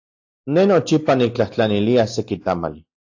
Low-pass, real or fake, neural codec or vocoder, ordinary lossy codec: 7.2 kHz; real; none; AAC, 48 kbps